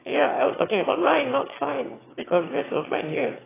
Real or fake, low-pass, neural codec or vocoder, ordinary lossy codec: fake; 3.6 kHz; autoencoder, 22.05 kHz, a latent of 192 numbers a frame, VITS, trained on one speaker; AAC, 16 kbps